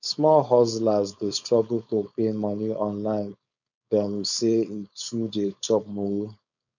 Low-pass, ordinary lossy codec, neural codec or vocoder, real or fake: 7.2 kHz; none; codec, 16 kHz, 4.8 kbps, FACodec; fake